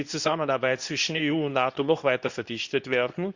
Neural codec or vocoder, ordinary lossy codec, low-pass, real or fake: codec, 24 kHz, 0.9 kbps, WavTokenizer, medium speech release version 2; Opus, 64 kbps; 7.2 kHz; fake